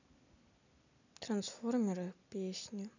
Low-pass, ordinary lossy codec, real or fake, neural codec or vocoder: 7.2 kHz; none; real; none